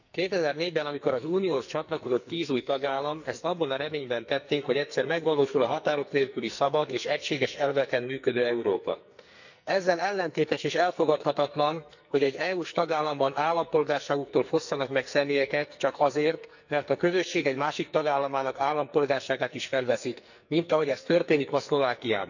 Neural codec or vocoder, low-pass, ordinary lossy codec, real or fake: codec, 44.1 kHz, 2.6 kbps, SNAC; 7.2 kHz; none; fake